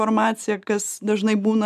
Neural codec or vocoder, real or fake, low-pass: none; real; 14.4 kHz